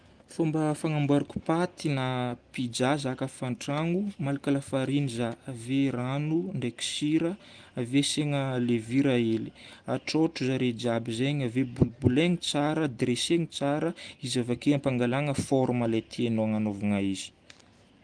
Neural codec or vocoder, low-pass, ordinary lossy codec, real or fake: none; 9.9 kHz; Opus, 24 kbps; real